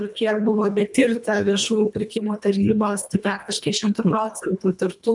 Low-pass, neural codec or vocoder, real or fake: 10.8 kHz; codec, 24 kHz, 1.5 kbps, HILCodec; fake